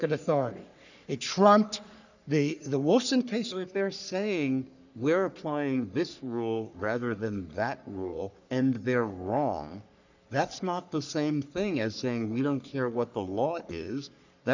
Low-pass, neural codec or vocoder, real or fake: 7.2 kHz; codec, 44.1 kHz, 3.4 kbps, Pupu-Codec; fake